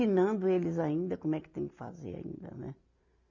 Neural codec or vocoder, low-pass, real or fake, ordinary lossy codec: none; 7.2 kHz; real; none